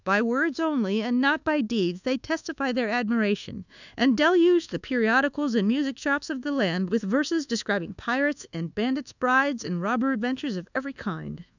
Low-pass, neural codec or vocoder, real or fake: 7.2 kHz; codec, 24 kHz, 1.2 kbps, DualCodec; fake